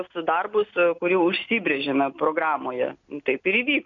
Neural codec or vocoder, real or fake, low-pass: none; real; 7.2 kHz